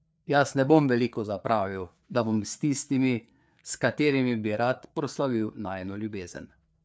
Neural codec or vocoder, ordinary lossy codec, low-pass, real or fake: codec, 16 kHz, 4 kbps, FreqCodec, larger model; none; none; fake